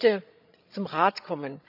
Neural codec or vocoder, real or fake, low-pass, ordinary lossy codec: codec, 16 kHz, 16 kbps, FreqCodec, larger model; fake; 5.4 kHz; none